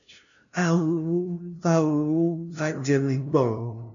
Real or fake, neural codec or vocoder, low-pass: fake; codec, 16 kHz, 0.5 kbps, FunCodec, trained on LibriTTS, 25 frames a second; 7.2 kHz